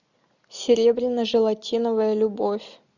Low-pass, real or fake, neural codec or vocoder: 7.2 kHz; real; none